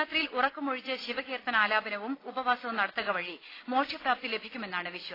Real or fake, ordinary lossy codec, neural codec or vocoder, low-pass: real; AAC, 24 kbps; none; 5.4 kHz